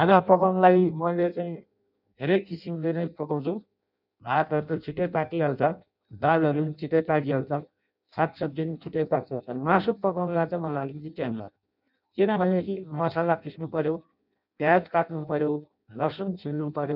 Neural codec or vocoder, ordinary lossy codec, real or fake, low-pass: codec, 16 kHz in and 24 kHz out, 0.6 kbps, FireRedTTS-2 codec; none; fake; 5.4 kHz